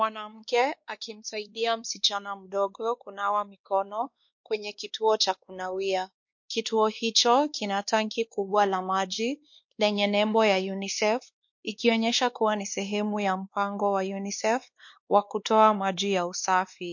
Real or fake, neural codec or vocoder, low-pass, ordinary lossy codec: fake; codec, 16 kHz, 2 kbps, X-Codec, WavLM features, trained on Multilingual LibriSpeech; 7.2 kHz; MP3, 48 kbps